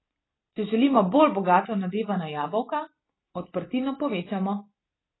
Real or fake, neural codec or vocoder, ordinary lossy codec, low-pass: real; none; AAC, 16 kbps; 7.2 kHz